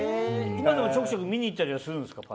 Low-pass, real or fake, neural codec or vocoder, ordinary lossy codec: none; real; none; none